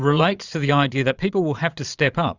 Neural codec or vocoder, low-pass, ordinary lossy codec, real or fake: vocoder, 22.05 kHz, 80 mel bands, Vocos; 7.2 kHz; Opus, 64 kbps; fake